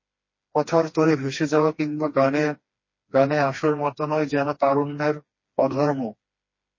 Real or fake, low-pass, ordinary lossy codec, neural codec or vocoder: fake; 7.2 kHz; MP3, 32 kbps; codec, 16 kHz, 2 kbps, FreqCodec, smaller model